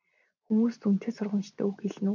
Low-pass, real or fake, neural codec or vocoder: 7.2 kHz; real; none